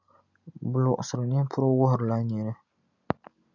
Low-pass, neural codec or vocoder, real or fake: 7.2 kHz; none; real